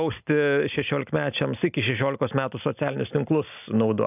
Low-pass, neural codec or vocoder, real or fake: 3.6 kHz; none; real